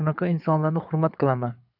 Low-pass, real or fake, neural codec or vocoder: 5.4 kHz; fake; codec, 24 kHz, 6 kbps, HILCodec